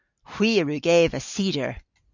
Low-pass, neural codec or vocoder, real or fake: 7.2 kHz; none; real